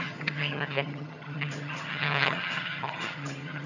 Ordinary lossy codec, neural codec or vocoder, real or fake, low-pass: none; vocoder, 22.05 kHz, 80 mel bands, HiFi-GAN; fake; 7.2 kHz